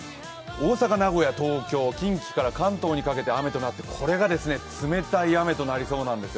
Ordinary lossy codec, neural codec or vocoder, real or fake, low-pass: none; none; real; none